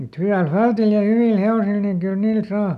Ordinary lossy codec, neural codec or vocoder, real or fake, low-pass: none; none; real; 14.4 kHz